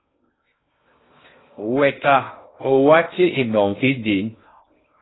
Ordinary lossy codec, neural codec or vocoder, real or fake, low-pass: AAC, 16 kbps; codec, 16 kHz in and 24 kHz out, 0.6 kbps, FocalCodec, streaming, 2048 codes; fake; 7.2 kHz